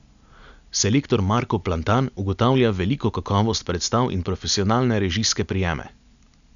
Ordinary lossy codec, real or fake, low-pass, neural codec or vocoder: none; real; 7.2 kHz; none